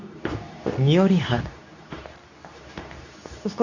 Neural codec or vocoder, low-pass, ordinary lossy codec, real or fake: codec, 24 kHz, 0.9 kbps, WavTokenizer, medium speech release version 2; 7.2 kHz; none; fake